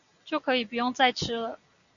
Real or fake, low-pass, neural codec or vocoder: real; 7.2 kHz; none